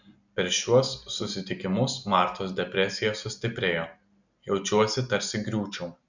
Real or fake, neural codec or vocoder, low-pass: real; none; 7.2 kHz